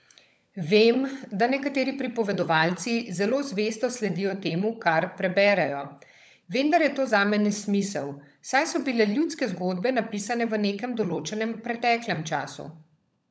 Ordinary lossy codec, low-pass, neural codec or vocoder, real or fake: none; none; codec, 16 kHz, 16 kbps, FunCodec, trained on LibriTTS, 50 frames a second; fake